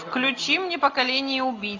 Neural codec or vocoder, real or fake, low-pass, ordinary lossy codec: none; real; 7.2 kHz; AAC, 48 kbps